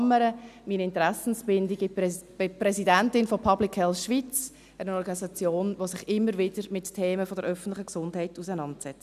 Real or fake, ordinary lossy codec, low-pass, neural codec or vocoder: real; none; 14.4 kHz; none